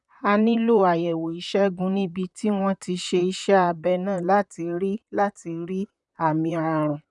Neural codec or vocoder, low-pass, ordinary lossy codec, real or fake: vocoder, 44.1 kHz, 128 mel bands, Pupu-Vocoder; 10.8 kHz; none; fake